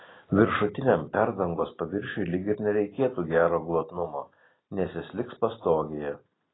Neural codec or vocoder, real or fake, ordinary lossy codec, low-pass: none; real; AAC, 16 kbps; 7.2 kHz